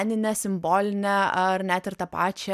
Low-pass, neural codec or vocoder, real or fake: 14.4 kHz; none; real